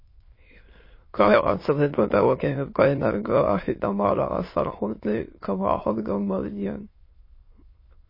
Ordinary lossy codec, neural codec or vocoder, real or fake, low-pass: MP3, 24 kbps; autoencoder, 22.05 kHz, a latent of 192 numbers a frame, VITS, trained on many speakers; fake; 5.4 kHz